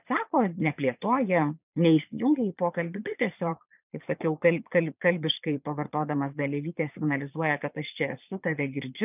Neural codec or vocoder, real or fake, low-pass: vocoder, 22.05 kHz, 80 mel bands, Vocos; fake; 3.6 kHz